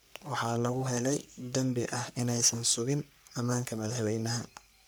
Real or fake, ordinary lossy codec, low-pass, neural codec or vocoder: fake; none; none; codec, 44.1 kHz, 3.4 kbps, Pupu-Codec